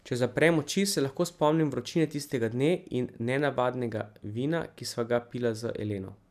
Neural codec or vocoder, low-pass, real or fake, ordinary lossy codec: none; 14.4 kHz; real; none